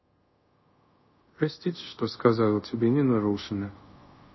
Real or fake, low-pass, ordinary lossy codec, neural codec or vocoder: fake; 7.2 kHz; MP3, 24 kbps; codec, 24 kHz, 0.5 kbps, DualCodec